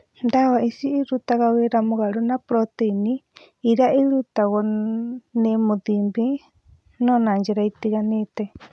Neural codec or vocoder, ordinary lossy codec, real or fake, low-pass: none; none; real; none